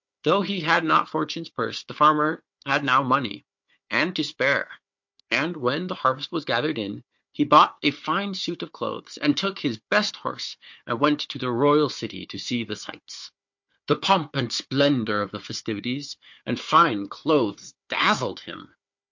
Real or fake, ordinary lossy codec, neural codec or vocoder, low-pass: fake; MP3, 48 kbps; codec, 16 kHz, 4 kbps, FunCodec, trained on Chinese and English, 50 frames a second; 7.2 kHz